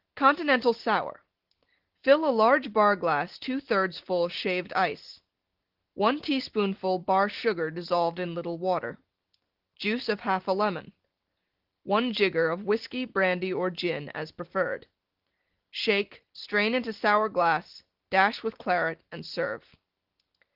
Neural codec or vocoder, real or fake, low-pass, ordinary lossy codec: none; real; 5.4 kHz; Opus, 16 kbps